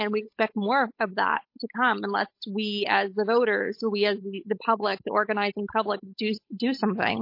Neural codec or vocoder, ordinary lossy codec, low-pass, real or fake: codec, 16 kHz, 8 kbps, FunCodec, trained on Chinese and English, 25 frames a second; MP3, 32 kbps; 5.4 kHz; fake